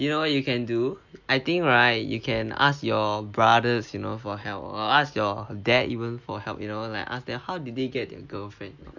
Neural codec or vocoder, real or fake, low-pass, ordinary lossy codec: none; real; 7.2 kHz; none